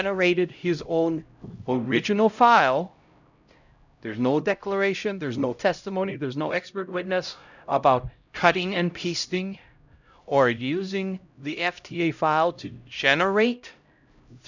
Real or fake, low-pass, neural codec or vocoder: fake; 7.2 kHz; codec, 16 kHz, 0.5 kbps, X-Codec, HuBERT features, trained on LibriSpeech